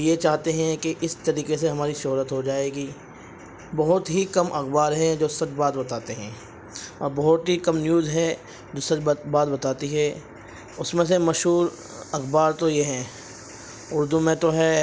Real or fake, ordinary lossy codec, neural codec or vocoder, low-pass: real; none; none; none